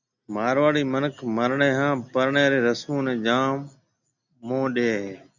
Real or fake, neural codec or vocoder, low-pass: real; none; 7.2 kHz